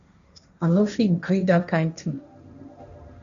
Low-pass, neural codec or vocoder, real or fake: 7.2 kHz; codec, 16 kHz, 1.1 kbps, Voila-Tokenizer; fake